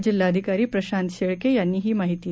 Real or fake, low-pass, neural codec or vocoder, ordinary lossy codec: real; none; none; none